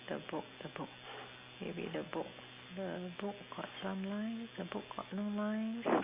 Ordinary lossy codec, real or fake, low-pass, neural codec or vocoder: Opus, 64 kbps; real; 3.6 kHz; none